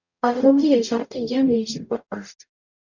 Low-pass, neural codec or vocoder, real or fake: 7.2 kHz; codec, 44.1 kHz, 0.9 kbps, DAC; fake